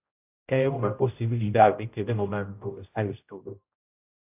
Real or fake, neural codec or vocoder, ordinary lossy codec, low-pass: fake; codec, 16 kHz, 0.5 kbps, X-Codec, HuBERT features, trained on general audio; AAC, 32 kbps; 3.6 kHz